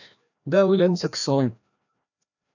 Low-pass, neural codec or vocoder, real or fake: 7.2 kHz; codec, 16 kHz, 1 kbps, FreqCodec, larger model; fake